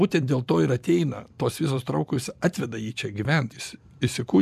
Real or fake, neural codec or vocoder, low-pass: fake; vocoder, 44.1 kHz, 128 mel bands every 256 samples, BigVGAN v2; 14.4 kHz